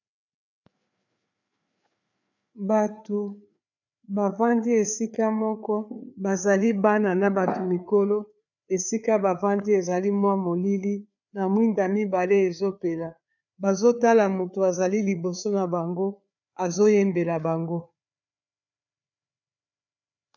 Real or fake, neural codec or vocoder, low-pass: fake; codec, 16 kHz, 4 kbps, FreqCodec, larger model; 7.2 kHz